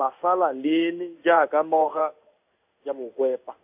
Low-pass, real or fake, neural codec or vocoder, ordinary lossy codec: 3.6 kHz; fake; codec, 16 kHz in and 24 kHz out, 1 kbps, XY-Tokenizer; AAC, 32 kbps